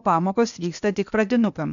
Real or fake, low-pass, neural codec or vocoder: fake; 7.2 kHz; codec, 16 kHz, 0.8 kbps, ZipCodec